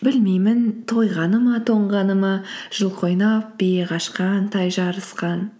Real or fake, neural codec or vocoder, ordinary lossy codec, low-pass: real; none; none; none